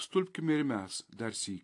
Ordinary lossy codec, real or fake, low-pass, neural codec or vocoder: AAC, 48 kbps; real; 10.8 kHz; none